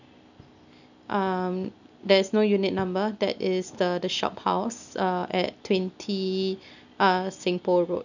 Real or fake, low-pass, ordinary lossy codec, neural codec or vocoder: real; 7.2 kHz; none; none